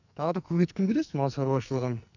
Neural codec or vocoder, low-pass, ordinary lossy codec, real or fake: codec, 44.1 kHz, 2.6 kbps, SNAC; 7.2 kHz; none; fake